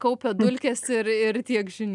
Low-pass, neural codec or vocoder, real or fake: 10.8 kHz; none; real